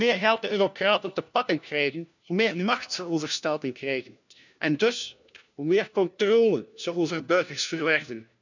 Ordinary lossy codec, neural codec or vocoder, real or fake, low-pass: none; codec, 16 kHz, 1 kbps, FunCodec, trained on LibriTTS, 50 frames a second; fake; 7.2 kHz